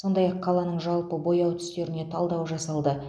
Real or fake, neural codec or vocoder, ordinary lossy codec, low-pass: real; none; none; none